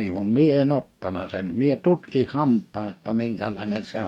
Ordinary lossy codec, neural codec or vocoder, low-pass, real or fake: none; codec, 44.1 kHz, 2.6 kbps, DAC; 19.8 kHz; fake